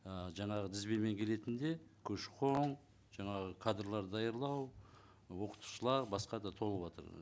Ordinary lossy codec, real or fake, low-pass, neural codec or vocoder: none; real; none; none